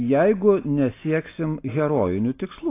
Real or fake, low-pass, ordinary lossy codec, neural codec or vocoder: real; 3.6 kHz; AAC, 24 kbps; none